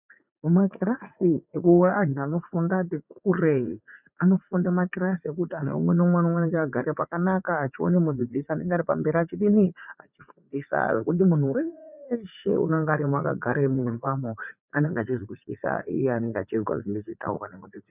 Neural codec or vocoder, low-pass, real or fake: vocoder, 44.1 kHz, 80 mel bands, Vocos; 3.6 kHz; fake